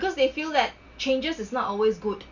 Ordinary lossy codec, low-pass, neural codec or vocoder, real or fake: none; 7.2 kHz; none; real